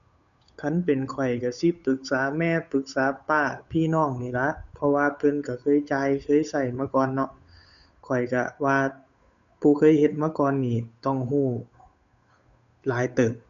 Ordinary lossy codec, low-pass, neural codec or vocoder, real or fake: none; 7.2 kHz; codec, 16 kHz, 8 kbps, FunCodec, trained on Chinese and English, 25 frames a second; fake